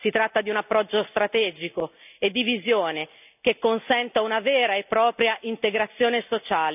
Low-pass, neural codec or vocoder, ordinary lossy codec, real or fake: 3.6 kHz; none; none; real